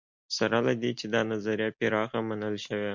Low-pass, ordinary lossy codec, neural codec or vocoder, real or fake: 7.2 kHz; MP3, 64 kbps; none; real